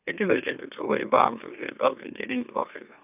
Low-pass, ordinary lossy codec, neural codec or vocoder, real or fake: 3.6 kHz; none; autoencoder, 44.1 kHz, a latent of 192 numbers a frame, MeloTTS; fake